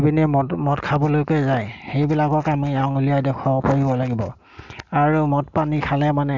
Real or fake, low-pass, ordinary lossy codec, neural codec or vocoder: real; 7.2 kHz; none; none